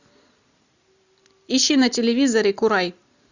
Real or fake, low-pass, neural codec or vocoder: real; 7.2 kHz; none